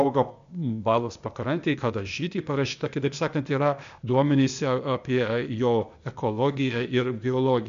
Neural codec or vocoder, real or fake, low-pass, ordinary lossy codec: codec, 16 kHz, 0.8 kbps, ZipCodec; fake; 7.2 kHz; MP3, 64 kbps